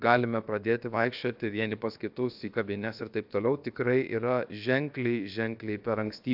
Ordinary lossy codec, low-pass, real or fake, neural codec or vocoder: AAC, 48 kbps; 5.4 kHz; fake; codec, 16 kHz, about 1 kbps, DyCAST, with the encoder's durations